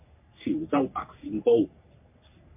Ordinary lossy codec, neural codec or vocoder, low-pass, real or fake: MP3, 32 kbps; codec, 44.1 kHz, 3.4 kbps, Pupu-Codec; 3.6 kHz; fake